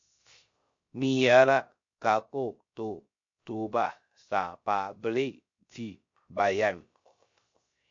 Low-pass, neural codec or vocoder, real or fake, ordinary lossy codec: 7.2 kHz; codec, 16 kHz, 0.3 kbps, FocalCodec; fake; MP3, 48 kbps